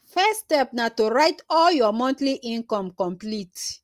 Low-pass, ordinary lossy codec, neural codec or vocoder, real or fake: 14.4 kHz; Opus, 32 kbps; none; real